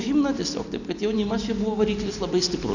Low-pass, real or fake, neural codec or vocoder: 7.2 kHz; real; none